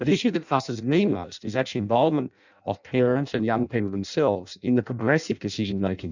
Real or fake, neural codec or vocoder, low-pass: fake; codec, 16 kHz in and 24 kHz out, 0.6 kbps, FireRedTTS-2 codec; 7.2 kHz